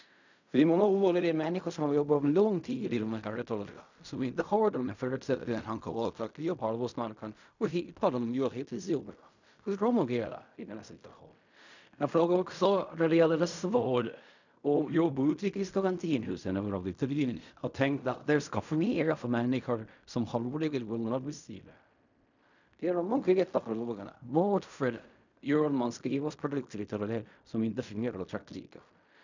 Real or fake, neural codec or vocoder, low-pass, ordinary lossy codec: fake; codec, 16 kHz in and 24 kHz out, 0.4 kbps, LongCat-Audio-Codec, fine tuned four codebook decoder; 7.2 kHz; none